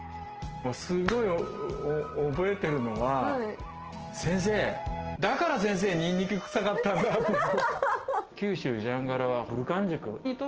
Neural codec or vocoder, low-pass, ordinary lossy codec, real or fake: none; 7.2 kHz; Opus, 16 kbps; real